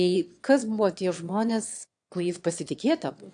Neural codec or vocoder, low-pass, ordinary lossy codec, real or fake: autoencoder, 22.05 kHz, a latent of 192 numbers a frame, VITS, trained on one speaker; 9.9 kHz; AAC, 64 kbps; fake